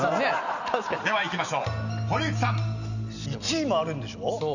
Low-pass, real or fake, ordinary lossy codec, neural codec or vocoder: 7.2 kHz; real; none; none